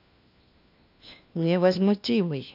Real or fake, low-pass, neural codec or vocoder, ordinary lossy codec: fake; 5.4 kHz; codec, 16 kHz, 1 kbps, FunCodec, trained on LibriTTS, 50 frames a second; none